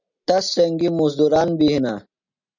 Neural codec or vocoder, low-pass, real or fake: none; 7.2 kHz; real